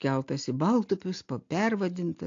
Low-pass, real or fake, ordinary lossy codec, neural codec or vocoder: 7.2 kHz; real; AAC, 48 kbps; none